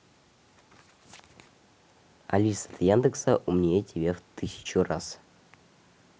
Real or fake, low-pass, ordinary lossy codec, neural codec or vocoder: real; none; none; none